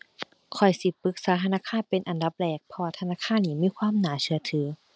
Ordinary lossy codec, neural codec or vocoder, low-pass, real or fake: none; none; none; real